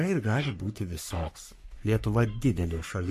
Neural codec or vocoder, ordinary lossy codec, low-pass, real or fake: codec, 44.1 kHz, 3.4 kbps, Pupu-Codec; MP3, 64 kbps; 14.4 kHz; fake